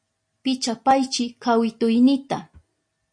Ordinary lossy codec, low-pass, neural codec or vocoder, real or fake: MP3, 48 kbps; 9.9 kHz; none; real